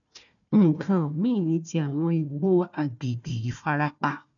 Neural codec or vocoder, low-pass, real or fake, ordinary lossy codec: codec, 16 kHz, 1 kbps, FunCodec, trained on Chinese and English, 50 frames a second; 7.2 kHz; fake; none